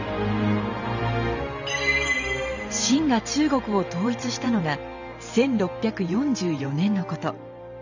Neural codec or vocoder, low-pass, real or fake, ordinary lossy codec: vocoder, 44.1 kHz, 128 mel bands every 512 samples, BigVGAN v2; 7.2 kHz; fake; none